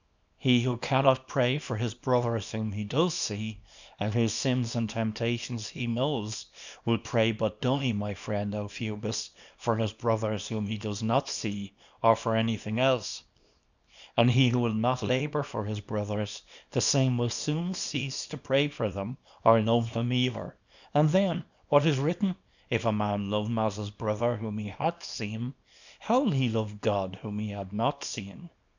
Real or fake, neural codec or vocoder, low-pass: fake; codec, 24 kHz, 0.9 kbps, WavTokenizer, small release; 7.2 kHz